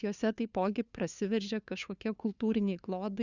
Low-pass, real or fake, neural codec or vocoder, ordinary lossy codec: 7.2 kHz; fake; codec, 16 kHz, 2 kbps, FunCodec, trained on LibriTTS, 25 frames a second; Opus, 64 kbps